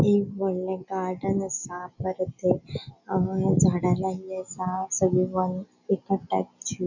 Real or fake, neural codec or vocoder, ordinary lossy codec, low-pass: real; none; none; 7.2 kHz